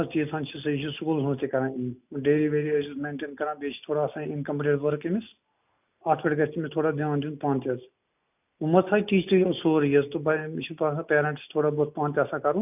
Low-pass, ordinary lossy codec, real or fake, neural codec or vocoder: 3.6 kHz; none; real; none